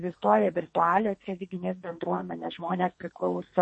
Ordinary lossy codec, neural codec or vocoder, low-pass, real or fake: MP3, 32 kbps; codec, 44.1 kHz, 2.6 kbps, SNAC; 10.8 kHz; fake